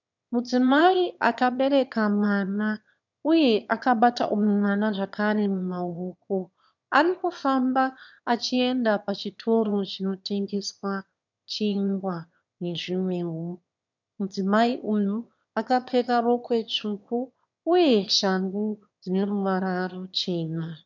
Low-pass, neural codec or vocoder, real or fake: 7.2 kHz; autoencoder, 22.05 kHz, a latent of 192 numbers a frame, VITS, trained on one speaker; fake